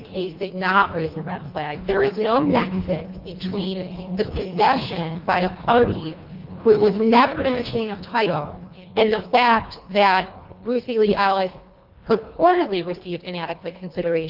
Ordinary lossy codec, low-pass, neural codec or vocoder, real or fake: Opus, 24 kbps; 5.4 kHz; codec, 24 kHz, 1.5 kbps, HILCodec; fake